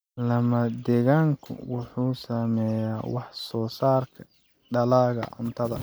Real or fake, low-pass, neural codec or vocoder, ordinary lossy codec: real; none; none; none